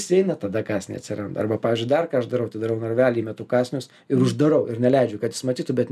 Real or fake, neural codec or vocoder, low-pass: real; none; 14.4 kHz